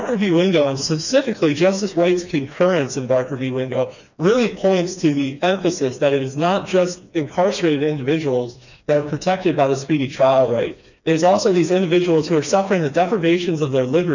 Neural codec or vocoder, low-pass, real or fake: codec, 16 kHz, 2 kbps, FreqCodec, smaller model; 7.2 kHz; fake